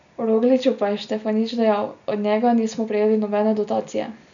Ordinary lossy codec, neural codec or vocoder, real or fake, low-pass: none; none; real; 7.2 kHz